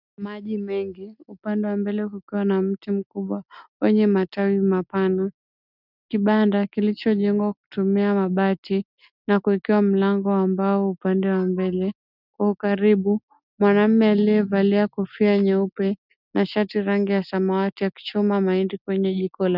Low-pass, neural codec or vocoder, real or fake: 5.4 kHz; none; real